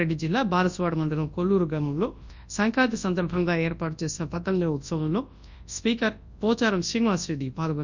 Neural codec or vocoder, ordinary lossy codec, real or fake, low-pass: codec, 24 kHz, 0.9 kbps, WavTokenizer, large speech release; Opus, 64 kbps; fake; 7.2 kHz